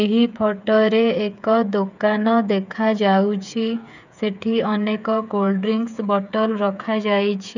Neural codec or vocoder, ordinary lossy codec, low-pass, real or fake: codec, 16 kHz, 8 kbps, FreqCodec, smaller model; none; 7.2 kHz; fake